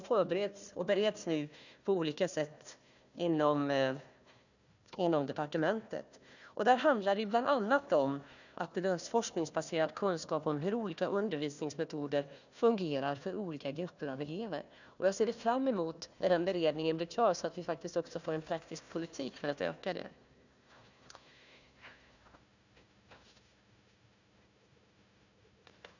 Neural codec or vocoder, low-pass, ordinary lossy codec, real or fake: codec, 16 kHz, 1 kbps, FunCodec, trained on Chinese and English, 50 frames a second; 7.2 kHz; none; fake